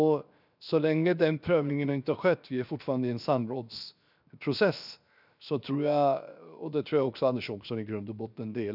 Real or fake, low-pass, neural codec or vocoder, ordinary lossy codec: fake; 5.4 kHz; codec, 16 kHz, 0.7 kbps, FocalCodec; none